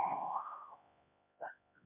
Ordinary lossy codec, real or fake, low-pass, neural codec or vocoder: MP3, 32 kbps; fake; 3.6 kHz; codec, 16 kHz, 1 kbps, X-Codec, HuBERT features, trained on LibriSpeech